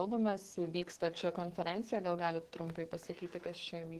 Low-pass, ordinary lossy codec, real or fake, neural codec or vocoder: 14.4 kHz; Opus, 16 kbps; fake; codec, 44.1 kHz, 2.6 kbps, SNAC